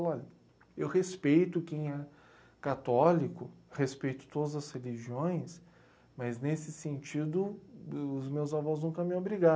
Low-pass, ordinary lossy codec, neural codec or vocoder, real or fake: none; none; none; real